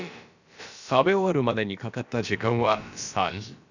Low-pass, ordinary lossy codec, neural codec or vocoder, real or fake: 7.2 kHz; none; codec, 16 kHz, about 1 kbps, DyCAST, with the encoder's durations; fake